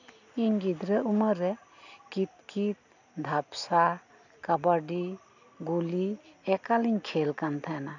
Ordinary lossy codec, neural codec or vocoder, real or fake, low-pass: none; none; real; 7.2 kHz